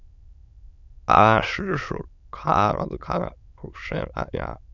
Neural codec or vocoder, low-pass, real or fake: autoencoder, 22.05 kHz, a latent of 192 numbers a frame, VITS, trained on many speakers; 7.2 kHz; fake